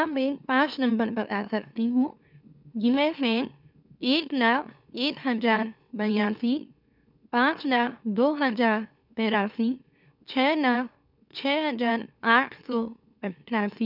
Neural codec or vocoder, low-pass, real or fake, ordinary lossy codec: autoencoder, 44.1 kHz, a latent of 192 numbers a frame, MeloTTS; 5.4 kHz; fake; none